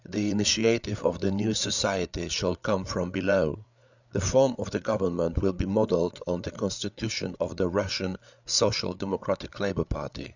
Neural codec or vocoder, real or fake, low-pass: codec, 16 kHz, 8 kbps, FreqCodec, larger model; fake; 7.2 kHz